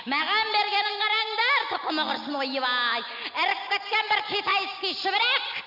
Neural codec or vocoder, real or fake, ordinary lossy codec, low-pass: none; real; none; 5.4 kHz